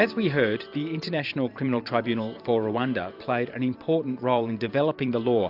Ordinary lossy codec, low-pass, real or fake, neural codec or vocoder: AAC, 48 kbps; 5.4 kHz; fake; vocoder, 44.1 kHz, 128 mel bands every 512 samples, BigVGAN v2